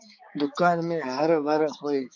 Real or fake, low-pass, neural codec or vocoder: fake; 7.2 kHz; codec, 16 kHz, 4 kbps, X-Codec, HuBERT features, trained on balanced general audio